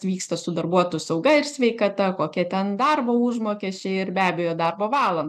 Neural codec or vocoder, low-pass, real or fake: none; 14.4 kHz; real